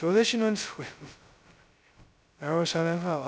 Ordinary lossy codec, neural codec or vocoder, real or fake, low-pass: none; codec, 16 kHz, 0.2 kbps, FocalCodec; fake; none